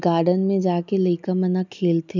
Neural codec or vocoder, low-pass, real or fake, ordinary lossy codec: none; 7.2 kHz; real; none